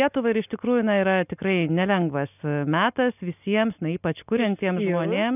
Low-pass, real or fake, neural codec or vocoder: 3.6 kHz; real; none